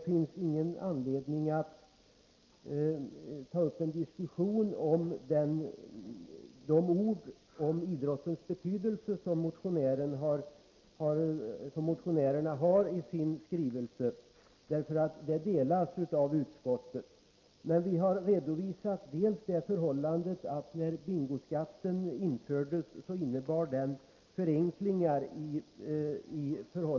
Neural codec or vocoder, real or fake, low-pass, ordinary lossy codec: none; real; 7.2 kHz; Opus, 24 kbps